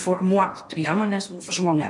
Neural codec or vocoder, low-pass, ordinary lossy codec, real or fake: codec, 16 kHz in and 24 kHz out, 0.8 kbps, FocalCodec, streaming, 65536 codes; 10.8 kHz; MP3, 64 kbps; fake